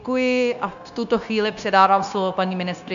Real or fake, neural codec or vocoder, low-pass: fake; codec, 16 kHz, 0.9 kbps, LongCat-Audio-Codec; 7.2 kHz